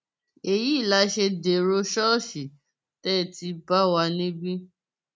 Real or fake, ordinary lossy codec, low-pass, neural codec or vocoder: real; none; none; none